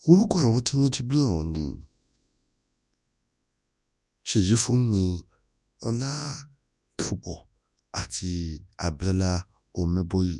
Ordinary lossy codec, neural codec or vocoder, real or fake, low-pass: none; codec, 24 kHz, 0.9 kbps, WavTokenizer, large speech release; fake; 10.8 kHz